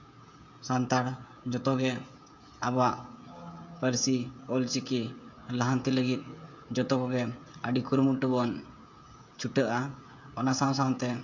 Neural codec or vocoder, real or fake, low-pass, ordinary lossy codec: codec, 16 kHz, 16 kbps, FreqCodec, smaller model; fake; 7.2 kHz; MP3, 64 kbps